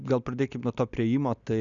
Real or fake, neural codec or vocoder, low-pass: real; none; 7.2 kHz